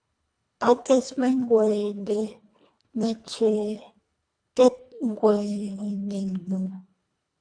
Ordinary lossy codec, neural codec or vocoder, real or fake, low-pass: AAC, 64 kbps; codec, 24 kHz, 1.5 kbps, HILCodec; fake; 9.9 kHz